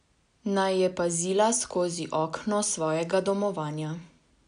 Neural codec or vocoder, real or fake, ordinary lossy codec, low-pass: none; real; none; 9.9 kHz